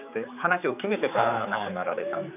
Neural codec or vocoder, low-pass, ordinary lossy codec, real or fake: codec, 16 kHz, 4 kbps, X-Codec, HuBERT features, trained on general audio; 3.6 kHz; none; fake